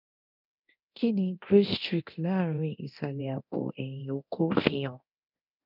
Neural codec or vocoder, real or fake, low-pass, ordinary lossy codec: codec, 24 kHz, 0.9 kbps, DualCodec; fake; 5.4 kHz; none